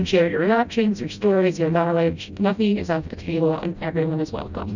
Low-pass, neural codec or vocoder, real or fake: 7.2 kHz; codec, 16 kHz, 0.5 kbps, FreqCodec, smaller model; fake